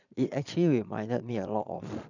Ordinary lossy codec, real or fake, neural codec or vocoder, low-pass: none; fake; vocoder, 22.05 kHz, 80 mel bands, Vocos; 7.2 kHz